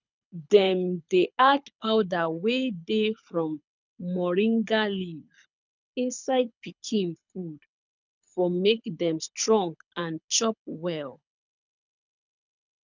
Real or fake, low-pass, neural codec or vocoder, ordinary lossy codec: fake; 7.2 kHz; codec, 24 kHz, 6 kbps, HILCodec; none